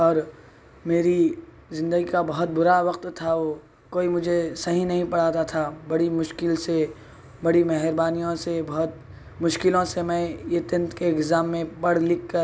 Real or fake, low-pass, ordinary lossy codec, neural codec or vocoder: real; none; none; none